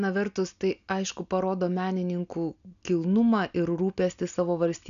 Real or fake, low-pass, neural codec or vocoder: real; 7.2 kHz; none